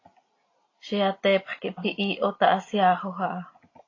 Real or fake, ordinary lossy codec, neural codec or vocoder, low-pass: real; AAC, 32 kbps; none; 7.2 kHz